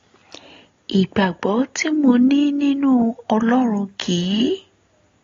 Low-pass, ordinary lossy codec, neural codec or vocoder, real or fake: 7.2 kHz; AAC, 32 kbps; none; real